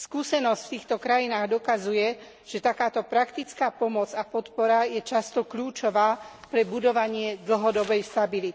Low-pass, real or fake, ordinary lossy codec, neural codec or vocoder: none; real; none; none